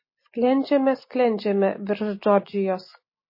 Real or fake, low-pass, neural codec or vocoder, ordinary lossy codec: real; 5.4 kHz; none; MP3, 24 kbps